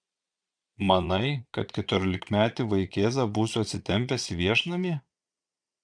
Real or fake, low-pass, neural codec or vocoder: fake; 9.9 kHz; vocoder, 22.05 kHz, 80 mel bands, Vocos